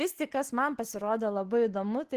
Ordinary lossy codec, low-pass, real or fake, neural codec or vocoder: Opus, 16 kbps; 14.4 kHz; real; none